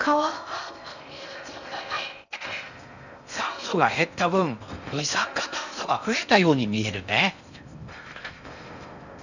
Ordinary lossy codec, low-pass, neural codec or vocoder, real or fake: none; 7.2 kHz; codec, 16 kHz in and 24 kHz out, 0.6 kbps, FocalCodec, streaming, 4096 codes; fake